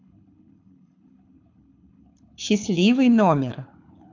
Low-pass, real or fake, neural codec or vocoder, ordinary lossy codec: 7.2 kHz; fake; codec, 24 kHz, 6 kbps, HILCodec; AAC, 48 kbps